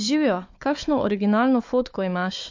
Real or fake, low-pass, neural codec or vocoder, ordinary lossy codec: fake; 7.2 kHz; codec, 16 kHz, 4 kbps, FunCodec, trained on Chinese and English, 50 frames a second; MP3, 48 kbps